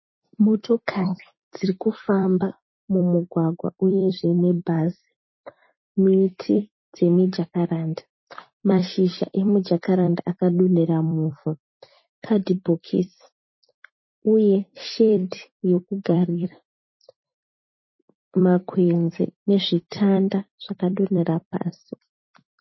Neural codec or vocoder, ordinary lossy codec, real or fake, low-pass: vocoder, 44.1 kHz, 128 mel bands every 256 samples, BigVGAN v2; MP3, 24 kbps; fake; 7.2 kHz